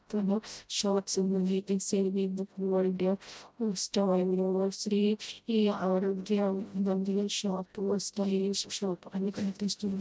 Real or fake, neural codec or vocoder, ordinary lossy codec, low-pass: fake; codec, 16 kHz, 0.5 kbps, FreqCodec, smaller model; none; none